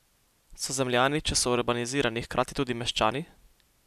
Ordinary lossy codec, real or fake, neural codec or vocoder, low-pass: none; real; none; 14.4 kHz